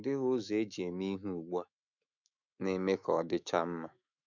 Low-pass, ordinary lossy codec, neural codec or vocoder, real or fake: 7.2 kHz; none; autoencoder, 48 kHz, 128 numbers a frame, DAC-VAE, trained on Japanese speech; fake